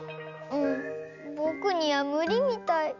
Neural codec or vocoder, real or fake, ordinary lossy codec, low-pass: none; real; none; 7.2 kHz